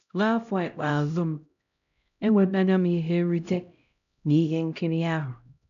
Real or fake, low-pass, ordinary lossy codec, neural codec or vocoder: fake; 7.2 kHz; none; codec, 16 kHz, 0.5 kbps, X-Codec, HuBERT features, trained on LibriSpeech